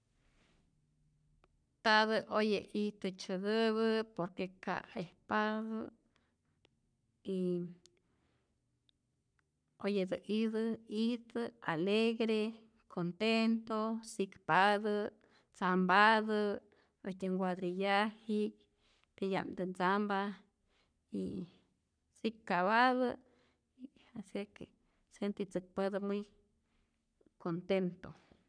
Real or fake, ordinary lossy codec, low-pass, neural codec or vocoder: fake; none; 9.9 kHz; codec, 44.1 kHz, 3.4 kbps, Pupu-Codec